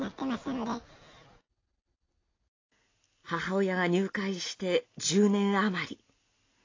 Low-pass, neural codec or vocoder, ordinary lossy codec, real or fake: 7.2 kHz; none; AAC, 32 kbps; real